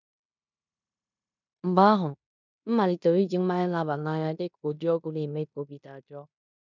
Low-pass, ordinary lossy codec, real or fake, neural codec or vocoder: 7.2 kHz; none; fake; codec, 16 kHz in and 24 kHz out, 0.9 kbps, LongCat-Audio-Codec, fine tuned four codebook decoder